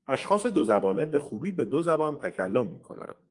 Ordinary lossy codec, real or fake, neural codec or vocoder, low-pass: Opus, 32 kbps; fake; codec, 32 kHz, 1.9 kbps, SNAC; 10.8 kHz